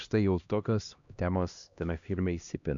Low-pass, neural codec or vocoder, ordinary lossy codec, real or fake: 7.2 kHz; codec, 16 kHz, 1 kbps, X-Codec, HuBERT features, trained on LibriSpeech; MP3, 96 kbps; fake